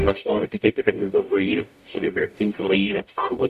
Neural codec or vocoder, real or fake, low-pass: codec, 44.1 kHz, 0.9 kbps, DAC; fake; 14.4 kHz